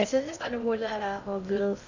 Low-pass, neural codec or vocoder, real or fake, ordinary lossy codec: 7.2 kHz; codec, 16 kHz in and 24 kHz out, 0.6 kbps, FocalCodec, streaming, 2048 codes; fake; none